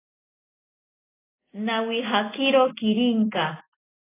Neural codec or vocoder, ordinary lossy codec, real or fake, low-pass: none; AAC, 16 kbps; real; 3.6 kHz